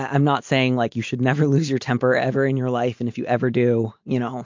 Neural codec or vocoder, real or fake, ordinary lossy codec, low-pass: none; real; MP3, 48 kbps; 7.2 kHz